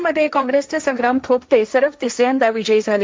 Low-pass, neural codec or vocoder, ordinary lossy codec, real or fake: none; codec, 16 kHz, 1.1 kbps, Voila-Tokenizer; none; fake